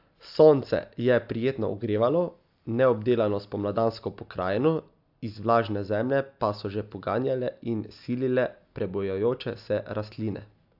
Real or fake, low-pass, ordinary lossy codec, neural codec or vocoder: real; 5.4 kHz; AAC, 48 kbps; none